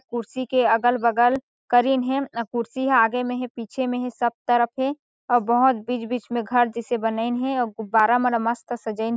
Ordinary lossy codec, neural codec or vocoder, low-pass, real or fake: none; none; none; real